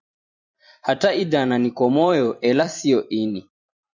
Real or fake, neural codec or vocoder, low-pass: fake; vocoder, 44.1 kHz, 128 mel bands every 256 samples, BigVGAN v2; 7.2 kHz